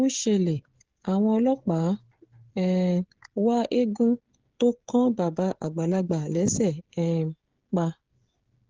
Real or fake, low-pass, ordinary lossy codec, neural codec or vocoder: fake; 7.2 kHz; Opus, 16 kbps; codec, 16 kHz, 16 kbps, FreqCodec, smaller model